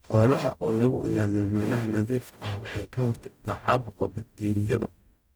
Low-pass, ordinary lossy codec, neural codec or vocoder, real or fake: none; none; codec, 44.1 kHz, 0.9 kbps, DAC; fake